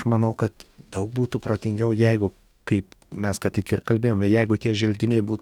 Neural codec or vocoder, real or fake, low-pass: codec, 44.1 kHz, 2.6 kbps, DAC; fake; 19.8 kHz